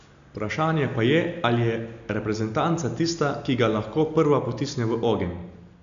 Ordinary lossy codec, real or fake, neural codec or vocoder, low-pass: AAC, 96 kbps; real; none; 7.2 kHz